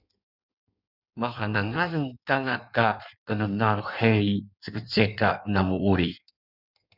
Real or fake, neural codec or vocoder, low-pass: fake; codec, 16 kHz in and 24 kHz out, 1.1 kbps, FireRedTTS-2 codec; 5.4 kHz